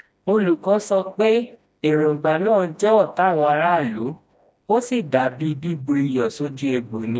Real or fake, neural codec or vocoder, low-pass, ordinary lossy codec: fake; codec, 16 kHz, 1 kbps, FreqCodec, smaller model; none; none